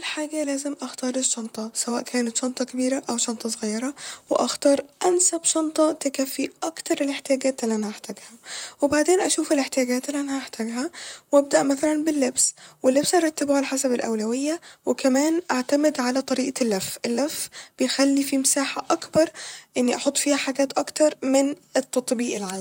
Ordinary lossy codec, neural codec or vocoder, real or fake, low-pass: none; vocoder, 44.1 kHz, 128 mel bands, Pupu-Vocoder; fake; 19.8 kHz